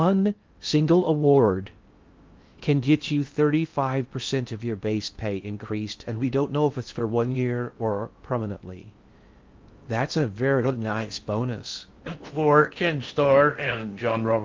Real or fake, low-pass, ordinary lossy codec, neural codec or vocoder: fake; 7.2 kHz; Opus, 32 kbps; codec, 16 kHz in and 24 kHz out, 0.6 kbps, FocalCodec, streaming, 2048 codes